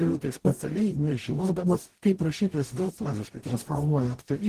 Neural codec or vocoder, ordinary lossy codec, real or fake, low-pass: codec, 44.1 kHz, 0.9 kbps, DAC; Opus, 16 kbps; fake; 14.4 kHz